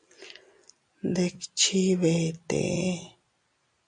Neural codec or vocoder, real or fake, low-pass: none; real; 9.9 kHz